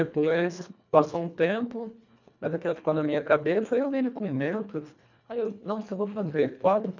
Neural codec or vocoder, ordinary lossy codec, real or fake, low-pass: codec, 24 kHz, 1.5 kbps, HILCodec; none; fake; 7.2 kHz